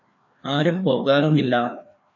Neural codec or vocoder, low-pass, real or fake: codec, 16 kHz, 2 kbps, FreqCodec, larger model; 7.2 kHz; fake